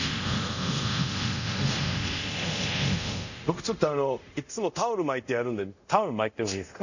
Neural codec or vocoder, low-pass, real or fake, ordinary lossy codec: codec, 24 kHz, 0.5 kbps, DualCodec; 7.2 kHz; fake; none